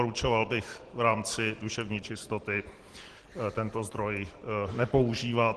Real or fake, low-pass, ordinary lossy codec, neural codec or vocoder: real; 10.8 kHz; Opus, 16 kbps; none